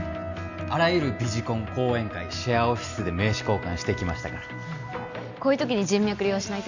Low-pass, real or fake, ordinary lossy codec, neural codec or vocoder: 7.2 kHz; real; none; none